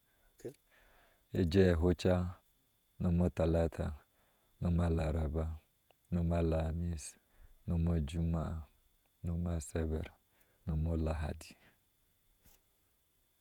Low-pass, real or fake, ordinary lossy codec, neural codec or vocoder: 19.8 kHz; fake; none; vocoder, 48 kHz, 128 mel bands, Vocos